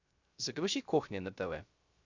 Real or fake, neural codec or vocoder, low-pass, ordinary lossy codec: fake; codec, 16 kHz, 0.3 kbps, FocalCodec; 7.2 kHz; Opus, 64 kbps